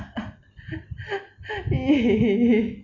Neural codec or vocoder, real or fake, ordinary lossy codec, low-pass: none; real; none; 7.2 kHz